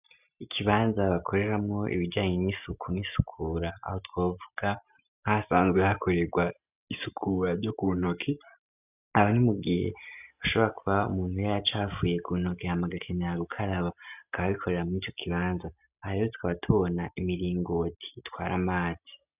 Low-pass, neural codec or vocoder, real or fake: 3.6 kHz; none; real